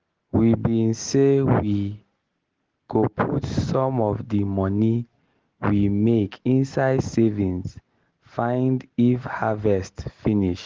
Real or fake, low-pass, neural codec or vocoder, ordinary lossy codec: real; 7.2 kHz; none; Opus, 16 kbps